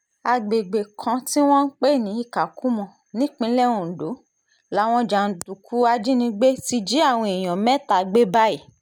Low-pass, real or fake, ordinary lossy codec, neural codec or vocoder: none; real; none; none